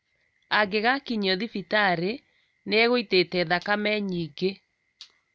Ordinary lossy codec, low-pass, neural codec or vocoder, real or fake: none; none; none; real